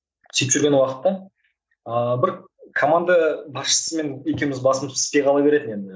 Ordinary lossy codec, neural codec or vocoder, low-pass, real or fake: none; none; none; real